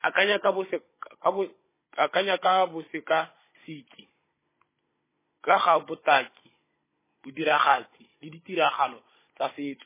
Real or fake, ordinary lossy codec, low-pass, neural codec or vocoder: fake; MP3, 16 kbps; 3.6 kHz; codec, 16 kHz, 16 kbps, FunCodec, trained on Chinese and English, 50 frames a second